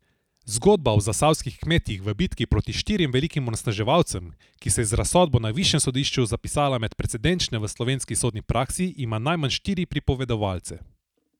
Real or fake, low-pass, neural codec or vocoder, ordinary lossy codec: real; 19.8 kHz; none; none